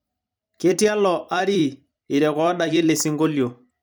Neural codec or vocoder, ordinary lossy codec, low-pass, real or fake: vocoder, 44.1 kHz, 128 mel bands every 256 samples, BigVGAN v2; none; none; fake